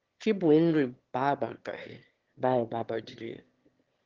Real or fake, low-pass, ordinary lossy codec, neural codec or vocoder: fake; 7.2 kHz; Opus, 16 kbps; autoencoder, 22.05 kHz, a latent of 192 numbers a frame, VITS, trained on one speaker